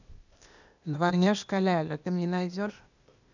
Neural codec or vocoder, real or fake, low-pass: codec, 16 kHz, 0.8 kbps, ZipCodec; fake; 7.2 kHz